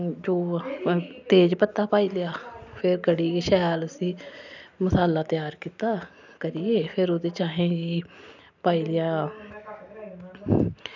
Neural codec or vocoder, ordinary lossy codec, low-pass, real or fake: none; none; 7.2 kHz; real